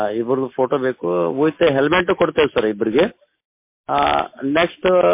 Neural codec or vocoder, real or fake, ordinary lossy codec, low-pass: none; real; MP3, 24 kbps; 3.6 kHz